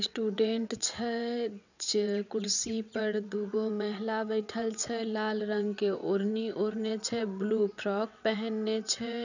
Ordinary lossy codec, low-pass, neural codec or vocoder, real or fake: none; 7.2 kHz; vocoder, 22.05 kHz, 80 mel bands, WaveNeXt; fake